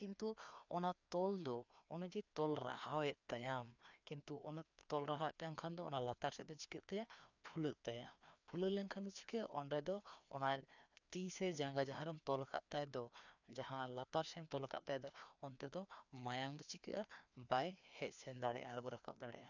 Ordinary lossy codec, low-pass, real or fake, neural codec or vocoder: none; 7.2 kHz; fake; codec, 16 kHz, 2 kbps, FreqCodec, larger model